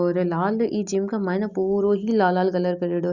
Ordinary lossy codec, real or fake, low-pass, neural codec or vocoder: Opus, 64 kbps; real; 7.2 kHz; none